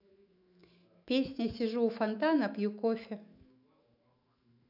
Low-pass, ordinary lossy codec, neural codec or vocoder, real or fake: 5.4 kHz; AAC, 48 kbps; autoencoder, 48 kHz, 128 numbers a frame, DAC-VAE, trained on Japanese speech; fake